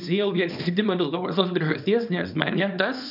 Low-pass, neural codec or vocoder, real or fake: 5.4 kHz; codec, 24 kHz, 0.9 kbps, WavTokenizer, small release; fake